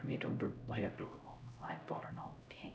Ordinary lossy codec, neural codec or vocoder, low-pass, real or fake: none; codec, 16 kHz, 0.5 kbps, X-Codec, HuBERT features, trained on LibriSpeech; none; fake